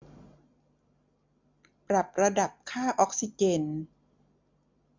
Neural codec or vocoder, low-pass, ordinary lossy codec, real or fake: none; 7.2 kHz; MP3, 64 kbps; real